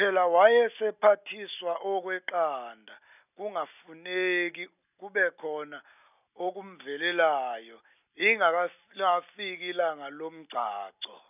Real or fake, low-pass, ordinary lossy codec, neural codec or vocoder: real; 3.6 kHz; none; none